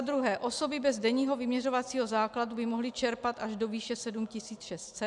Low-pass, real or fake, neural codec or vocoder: 10.8 kHz; real; none